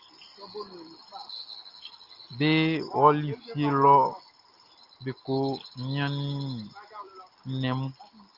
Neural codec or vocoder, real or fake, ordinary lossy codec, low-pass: none; real; Opus, 24 kbps; 5.4 kHz